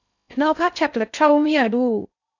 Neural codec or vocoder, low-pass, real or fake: codec, 16 kHz in and 24 kHz out, 0.6 kbps, FocalCodec, streaming, 2048 codes; 7.2 kHz; fake